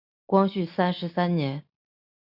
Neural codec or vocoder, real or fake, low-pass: none; real; 5.4 kHz